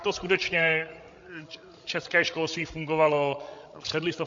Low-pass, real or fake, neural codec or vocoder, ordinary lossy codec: 7.2 kHz; fake; codec, 16 kHz, 16 kbps, FreqCodec, larger model; MP3, 48 kbps